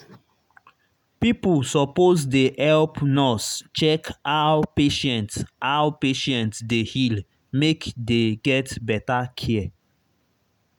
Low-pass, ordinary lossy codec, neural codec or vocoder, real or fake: 19.8 kHz; none; none; real